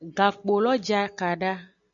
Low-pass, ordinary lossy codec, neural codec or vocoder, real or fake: 7.2 kHz; MP3, 96 kbps; none; real